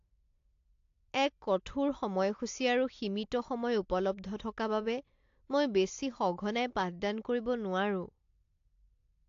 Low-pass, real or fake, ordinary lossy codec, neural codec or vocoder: 7.2 kHz; real; AAC, 48 kbps; none